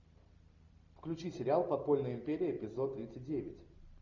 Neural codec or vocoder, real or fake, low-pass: none; real; 7.2 kHz